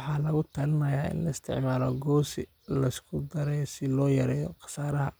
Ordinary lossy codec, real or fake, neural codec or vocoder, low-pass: none; real; none; none